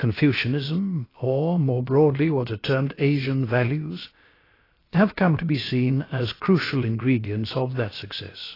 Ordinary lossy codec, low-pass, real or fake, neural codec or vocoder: AAC, 24 kbps; 5.4 kHz; fake; codec, 16 kHz, about 1 kbps, DyCAST, with the encoder's durations